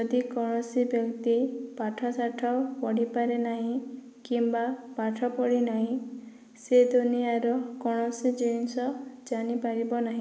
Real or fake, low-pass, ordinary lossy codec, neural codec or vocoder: real; none; none; none